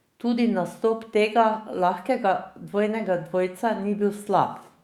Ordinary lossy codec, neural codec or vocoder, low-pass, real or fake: Opus, 64 kbps; autoencoder, 48 kHz, 128 numbers a frame, DAC-VAE, trained on Japanese speech; 19.8 kHz; fake